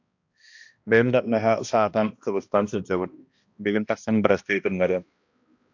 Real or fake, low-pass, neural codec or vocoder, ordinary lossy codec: fake; 7.2 kHz; codec, 16 kHz, 1 kbps, X-Codec, HuBERT features, trained on balanced general audio; AAC, 48 kbps